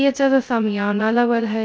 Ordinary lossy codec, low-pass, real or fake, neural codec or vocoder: none; none; fake; codec, 16 kHz, 0.2 kbps, FocalCodec